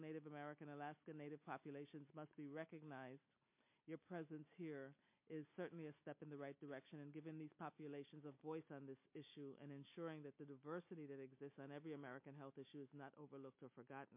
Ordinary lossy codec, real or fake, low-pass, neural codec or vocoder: MP3, 24 kbps; real; 3.6 kHz; none